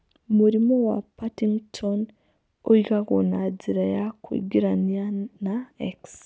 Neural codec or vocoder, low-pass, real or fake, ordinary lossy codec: none; none; real; none